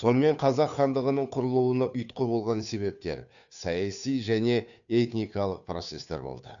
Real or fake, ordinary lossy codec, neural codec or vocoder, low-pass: fake; none; codec, 16 kHz, 2 kbps, FunCodec, trained on Chinese and English, 25 frames a second; 7.2 kHz